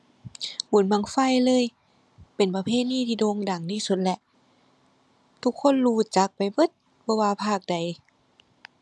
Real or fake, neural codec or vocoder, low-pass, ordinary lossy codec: real; none; none; none